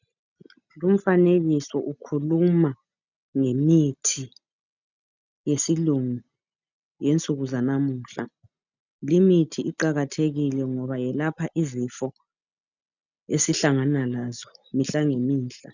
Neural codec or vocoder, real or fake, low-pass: none; real; 7.2 kHz